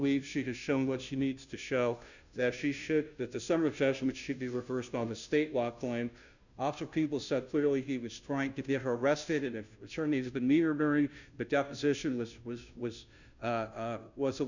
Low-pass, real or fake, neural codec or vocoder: 7.2 kHz; fake; codec, 16 kHz, 0.5 kbps, FunCodec, trained on Chinese and English, 25 frames a second